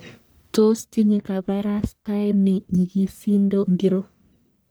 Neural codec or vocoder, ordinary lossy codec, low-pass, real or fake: codec, 44.1 kHz, 1.7 kbps, Pupu-Codec; none; none; fake